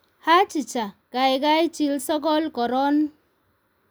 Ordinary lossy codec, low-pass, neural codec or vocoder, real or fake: none; none; none; real